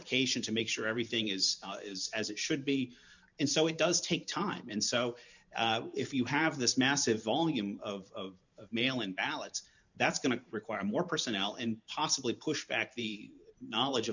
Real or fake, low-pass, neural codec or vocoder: fake; 7.2 kHz; vocoder, 44.1 kHz, 128 mel bands every 512 samples, BigVGAN v2